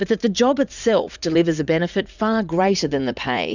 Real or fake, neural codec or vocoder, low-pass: fake; vocoder, 44.1 kHz, 80 mel bands, Vocos; 7.2 kHz